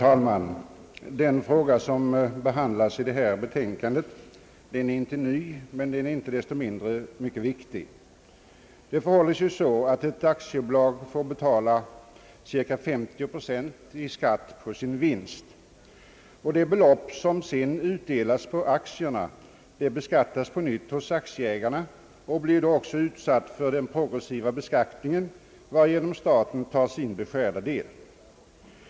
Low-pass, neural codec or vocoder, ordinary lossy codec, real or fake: none; none; none; real